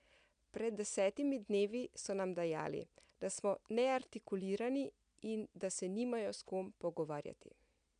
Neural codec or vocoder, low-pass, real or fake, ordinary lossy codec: none; 9.9 kHz; real; MP3, 96 kbps